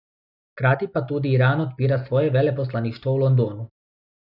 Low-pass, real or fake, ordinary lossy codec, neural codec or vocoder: 5.4 kHz; real; none; none